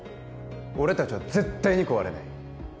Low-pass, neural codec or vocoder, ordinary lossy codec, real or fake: none; none; none; real